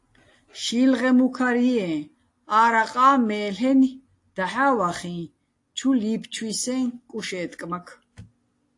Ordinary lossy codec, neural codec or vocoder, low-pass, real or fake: AAC, 48 kbps; none; 10.8 kHz; real